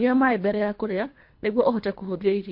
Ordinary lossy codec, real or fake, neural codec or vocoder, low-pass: MP3, 32 kbps; fake; codec, 24 kHz, 3 kbps, HILCodec; 5.4 kHz